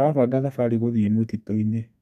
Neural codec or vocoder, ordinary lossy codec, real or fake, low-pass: codec, 32 kHz, 1.9 kbps, SNAC; none; fake; 14.4 kHz